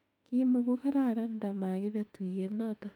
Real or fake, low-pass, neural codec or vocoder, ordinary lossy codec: fake; 19.8 kHz; autoencoder, 48 kHz, 32 numbers a frame, DAC-VAE, trained on Japanese speech; none